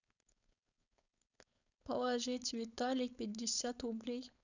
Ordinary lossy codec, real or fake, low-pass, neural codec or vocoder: none; fake; 7.2 kHz; codec, 16 kHz, 4.8 kbps, FACodec